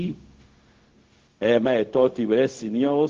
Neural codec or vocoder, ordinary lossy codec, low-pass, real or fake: codec, 16 kHz, 0.4 kbps, LongCat-Audio-Codec; Opus, 16 kbps; 7.2 kHz; fake